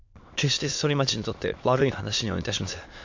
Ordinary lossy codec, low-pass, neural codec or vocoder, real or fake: MP3, 48 kbps; 7.2 kHz; autoencoder, 22.05 kHz, a latent of 192 numbers a frame, VITS, trained on many speakers; fake